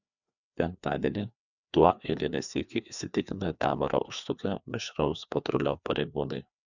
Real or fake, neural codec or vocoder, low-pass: fake; codec, 16 kHz, 2 kbps, FreqCodec, larger model; 7.2 kHz